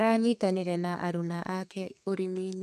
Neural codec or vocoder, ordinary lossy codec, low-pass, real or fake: codec, 32 kHz, 1.9 kbps, SNAC; none; 14.4 kHz; fake